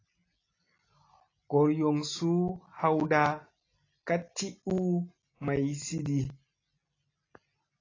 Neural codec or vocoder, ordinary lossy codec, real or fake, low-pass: none; AAC, 32 kbps; real; 7.2 kHz